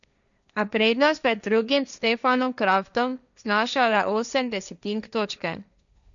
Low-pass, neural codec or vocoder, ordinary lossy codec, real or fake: 7.2 kHz; codec, 16 kHz, 1.1 kbps, Voila-Tokenizer; none; fake